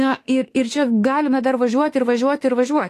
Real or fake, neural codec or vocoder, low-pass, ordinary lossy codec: fake; autoencoder, 48 kHz, 32 numbers a frame, DAC-VAE, trained on Japanese speech; 14.4 kHz; AAC, 48 kbps